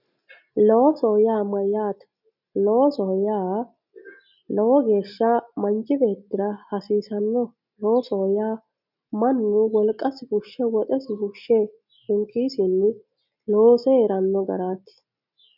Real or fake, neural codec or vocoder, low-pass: real; none; 5.4 kHz